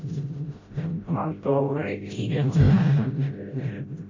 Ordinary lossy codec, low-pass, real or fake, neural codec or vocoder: MP3, 32 kbps; 7.2 kHz; fake; codec, 16 kHz, 0.5 kbps, FreqCodec, smaller model